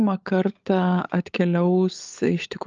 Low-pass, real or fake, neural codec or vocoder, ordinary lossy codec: 7.2 kHz; fake; codec, 16 kHz, 16 kbps, FunCodec, trained on LibriTTS, 50 frames a second; Opus, 24 kbps